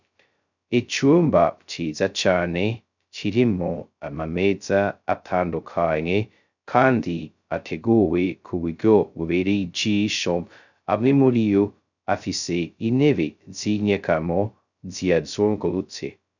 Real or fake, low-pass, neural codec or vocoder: fake; 7.2 kHz; codec, 16 kHz, 0.2 kbps, FocalCodec